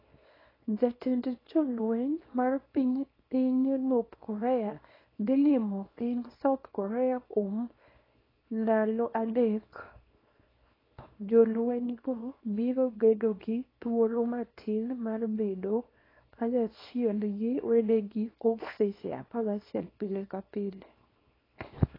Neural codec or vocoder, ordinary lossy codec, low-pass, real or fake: codec, 24 kHz, 0.9 kbps, WavTokenizer, small release; AAC, 24 kbps; 5.4 kHz; fake